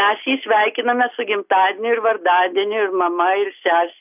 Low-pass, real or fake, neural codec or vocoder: 3.6 kHz; real; none